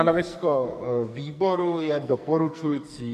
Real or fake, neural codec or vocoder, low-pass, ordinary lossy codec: fake; codec, 44.1 kHz, 2.6 kbps, SNAC; 14.4 kHz; MP3, 96 kbps